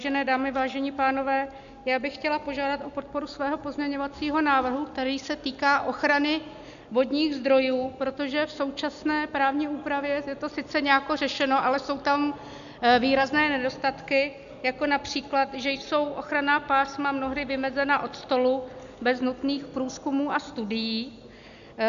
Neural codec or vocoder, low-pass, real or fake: none; 7.2 kHz; real